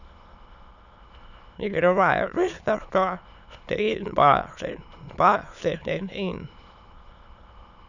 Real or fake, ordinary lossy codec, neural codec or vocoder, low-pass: fake; none; autoencoder, 22.05 kHz, a latent of 192 numbers a frame, VITS, trained on many speakers; 7.2 kHz